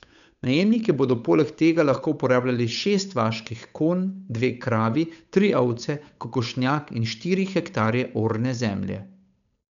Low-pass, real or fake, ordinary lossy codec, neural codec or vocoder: 7.2 kHz; fake; none; codec, 16 kHz, 8 kbps, FunCodec, trained on Chinese and English, 25 frames a second